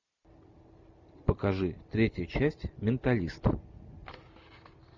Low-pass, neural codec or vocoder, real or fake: 7.2 kHz; none; real